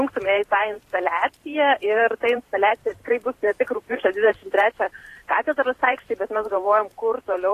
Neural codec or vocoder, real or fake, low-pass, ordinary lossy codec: vocoder, 44.1 kHz, 128 mel bands every 512 samples, BigVGAN v2; fake; 14.4 kHz; AAC, 48 kbps